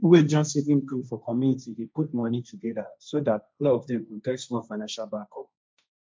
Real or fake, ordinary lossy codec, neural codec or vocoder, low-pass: fake; none; codec, 16 kHz, 1.1 kbps, Voila-Tokenizer; none